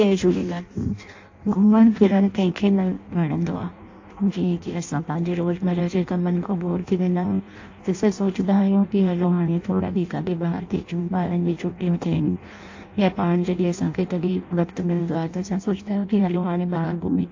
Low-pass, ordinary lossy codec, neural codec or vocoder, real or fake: 7.2 kHz; AAC, 48 kbps; codec, 16 kHz in and 24 kHz out, 0.6 kbps, FireRedTTS-2 codec; fake